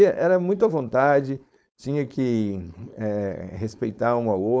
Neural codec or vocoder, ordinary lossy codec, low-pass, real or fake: codec, 16 kHz, 4.8 kbps, FACodec; none; none; fake